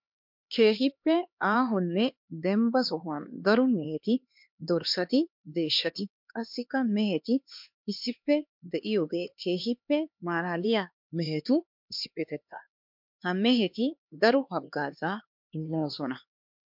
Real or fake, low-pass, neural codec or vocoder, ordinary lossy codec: fake; 5.4 kHz; codec, 16 kHz, 2 kbps, X-Codec, HuBERT features, trained on LibriSpeech; MP3, 48 kbps